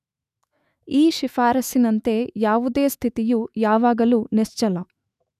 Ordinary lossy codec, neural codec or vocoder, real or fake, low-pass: none; autoencoder, 48 kHz, 128 numbers a frame, DAC-VAE, trained on Japanese speech; fake; 14.4 kHz